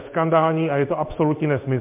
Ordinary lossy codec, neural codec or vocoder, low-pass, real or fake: MP3, 32 kbps; codec, 16 kHz in and 24 kHz out, 1 kbps, XY-Tokenizer; 3.6 kHz; fake